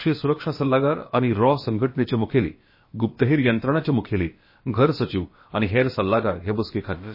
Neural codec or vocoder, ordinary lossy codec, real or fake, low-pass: codec, 16 kHz, about 1 kbps, DyCAST, with the encoder's durations; MP3, 24 kbps; fake; 5.4 kHz